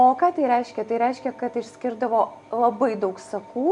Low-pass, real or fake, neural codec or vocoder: 10.8 kHz; real; none